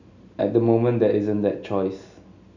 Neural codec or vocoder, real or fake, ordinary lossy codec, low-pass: none; real; none; 7.2 kHz